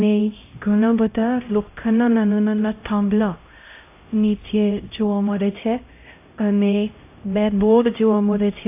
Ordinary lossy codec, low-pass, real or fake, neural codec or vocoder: AAC, 24 kbps; 3.6 kHz; fake; codec, 16 kHz, 0.5 kbps, X-Codec, HuBERT features, trained on LibriSpeech